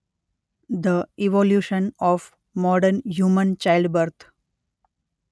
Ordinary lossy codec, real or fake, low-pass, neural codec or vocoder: none; real; none; none